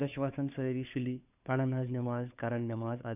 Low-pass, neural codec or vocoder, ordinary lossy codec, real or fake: 3.6 kHz; codec, 16 kHz, 2 kbps, FunCodec, trained on Chinese and English, 25 frames a second; none; fake